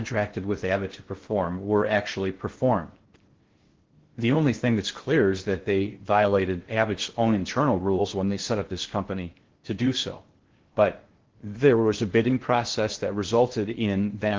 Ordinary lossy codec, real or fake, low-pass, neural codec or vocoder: Opus, 16 kbps; fake; 7.2 kHz; codec, 16 kHz in and 24 kHz out, 0.6 kbps, FocalCodec, streaming, 2048 codes